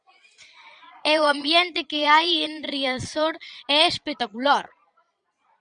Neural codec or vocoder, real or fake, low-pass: vocoder, 22.05 kHz, 80 mel bands, Vocos; fake; 9.9 kHz